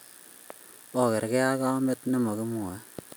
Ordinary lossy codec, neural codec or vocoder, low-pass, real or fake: none; none; none; real